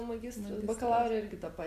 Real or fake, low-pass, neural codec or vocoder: real; 14.4 kHz; none